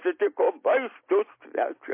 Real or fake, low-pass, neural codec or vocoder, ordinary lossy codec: real; 3.6 kHz; none; MP3, 24 kbps